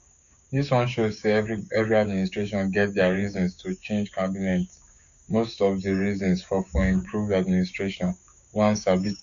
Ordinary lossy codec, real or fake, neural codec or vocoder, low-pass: none; fake; codec, 16 kHz, 6 kbps, DAC; 7.2 kHz